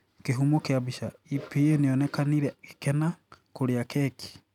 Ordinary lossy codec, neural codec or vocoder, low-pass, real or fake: none; none; 19.8 kHz; real